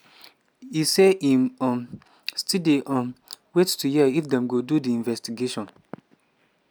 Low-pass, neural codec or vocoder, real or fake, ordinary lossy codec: none; none; real; none